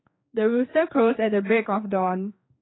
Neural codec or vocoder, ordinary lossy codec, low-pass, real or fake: codec, 16 kHz, 2 kbps, X-Codec, HuBERT features, trained on general audio; AAC, 16 kbps; 7.2 kHz; fake